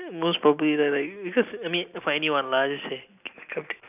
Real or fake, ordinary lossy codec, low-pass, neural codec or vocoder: real; none; 3.6 kHz; none